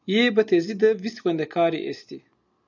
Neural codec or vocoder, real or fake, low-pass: none; real; 7.2 kHz